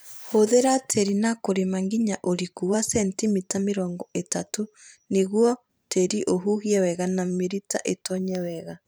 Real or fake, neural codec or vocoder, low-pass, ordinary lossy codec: fake; vocoder, 44.1 kHz, 128 mel bands every 512 samples, BigVGAN v2; none; none